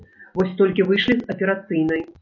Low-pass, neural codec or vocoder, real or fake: 7.2 kHz; none; real